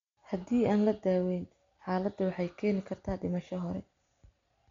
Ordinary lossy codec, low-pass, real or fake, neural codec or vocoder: MP3, 48 kbps; 7.2 kHz; real; none